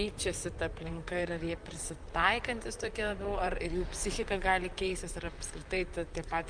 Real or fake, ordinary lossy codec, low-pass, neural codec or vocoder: fake; Opus, 32 kbps; 9.9 kHz; vocoder, 44.1 kHz, 128 mel bands, Pupu-Vocoder